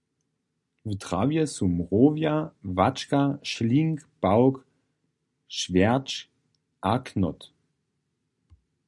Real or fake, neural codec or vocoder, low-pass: real; none; 10.8 kHz